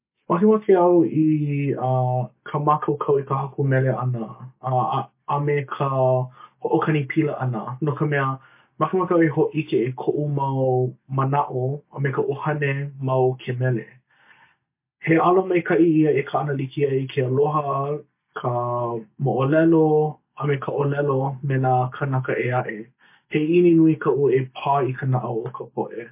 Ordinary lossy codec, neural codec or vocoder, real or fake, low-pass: MP3, 24 kbps; none; real; 3.6 kHz